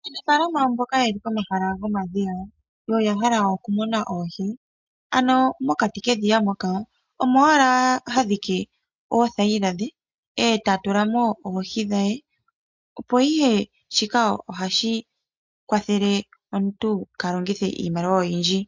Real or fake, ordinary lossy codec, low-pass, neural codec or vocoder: real; MP3, 64 kbps; 7.2 kHz; none